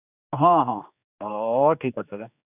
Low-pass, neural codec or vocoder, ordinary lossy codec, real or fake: 3.6 kHz; codec, 16 kHz, 2 kbps, X-Codec, HuBERT features, trained on general audio; none; fake